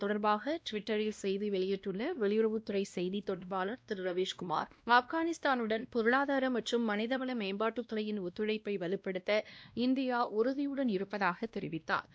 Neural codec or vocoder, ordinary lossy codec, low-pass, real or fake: codec, 16 kHz, 1 kbps, X-Codec, WavLM features, trained on Multilingual LibriSpeech; none; none; fake